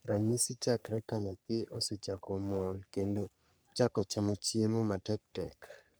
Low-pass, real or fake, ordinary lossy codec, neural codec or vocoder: none; fake; none; codec, 44.1 kHz, 3.4 kbps, Pupu-Codec